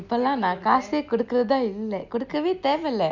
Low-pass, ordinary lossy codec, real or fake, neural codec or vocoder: 7.2 kHz; none; real; none